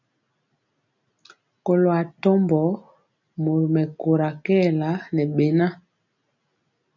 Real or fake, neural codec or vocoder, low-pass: real; none; 7.2 kHz